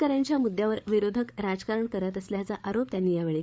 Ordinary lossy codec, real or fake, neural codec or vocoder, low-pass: none; fake; codec, 16 kHz, 8 kbps, FreqCodec, larger model; none